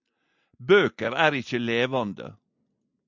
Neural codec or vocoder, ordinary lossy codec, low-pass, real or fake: none; MP3, 64 kbps; 7.2 kHz; real